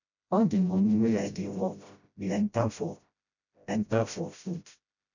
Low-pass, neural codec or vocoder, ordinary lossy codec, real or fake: 7.2 kHz; codec, 16 kHz, 0.5 kbps, FreqCodec, smaller model; none; fake